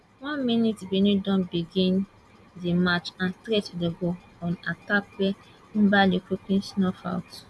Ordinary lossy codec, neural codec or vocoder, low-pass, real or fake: none; none; none; real